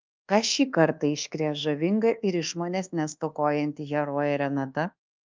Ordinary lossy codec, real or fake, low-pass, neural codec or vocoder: Opus, 32 kbps; fake; 7.2 kHz; codec, 24 kHz, 1.2 kbps, DualCodec